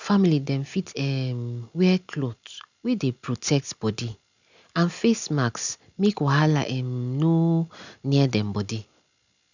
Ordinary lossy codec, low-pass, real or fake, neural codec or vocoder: none; 7.2 kHz; real; none